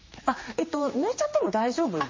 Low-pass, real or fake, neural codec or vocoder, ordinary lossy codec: 7.2 kHz; fake; codec, 16 kHz, 2 kbps, X-Codec, HuBERT features, trained on general audio; MP3, 32 kbps